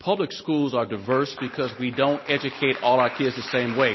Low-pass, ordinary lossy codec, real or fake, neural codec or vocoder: 7.2 kHz; MP3, 24 kbps; real; none